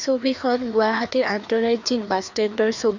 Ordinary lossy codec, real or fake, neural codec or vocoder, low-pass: none; fake; codec, 16 kHz, 2 kbps, FreqCodec, larger model; 7.2 kHz